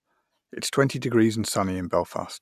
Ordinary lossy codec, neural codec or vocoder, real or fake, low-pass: none; none; real; 14.4 kHz